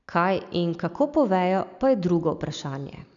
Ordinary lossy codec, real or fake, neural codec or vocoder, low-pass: none; real; none; 7.2 kHz